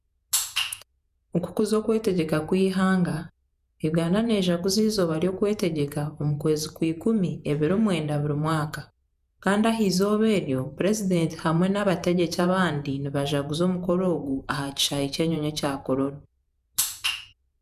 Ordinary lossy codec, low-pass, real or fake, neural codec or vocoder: none; 14.4 kHz; fake; vocoder, 48 kHz, 128 mel bands, Vocos